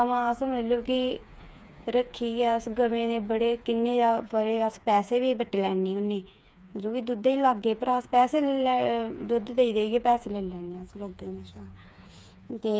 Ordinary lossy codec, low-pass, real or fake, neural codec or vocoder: none; none; fake; codec, 16 kHz, 4 kbps, FreqCodec, smaller model